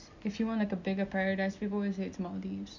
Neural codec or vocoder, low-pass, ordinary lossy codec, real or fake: none; 7.2 kHz; none; real